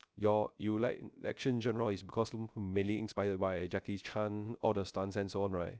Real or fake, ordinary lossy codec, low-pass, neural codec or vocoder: fake; none; none; codec, 16 kHz, 0.3 kbps, FocalCodec